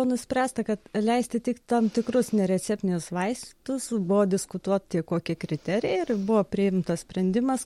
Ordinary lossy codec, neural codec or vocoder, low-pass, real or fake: MP3, 64 kbps; none; 19.8 kHz; real